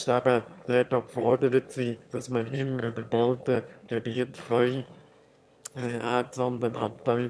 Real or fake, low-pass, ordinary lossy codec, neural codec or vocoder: fake; none; none; autoencoder, 22.05 kHz, a latent of 192 numbers a frame, VITS, trained on one speaker